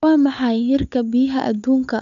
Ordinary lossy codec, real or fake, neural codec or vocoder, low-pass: none; fake; codec, 16 kHz, 8 kbps, FunCodec, trained on Chinese and English, 25 frames a second; 7.2 kHz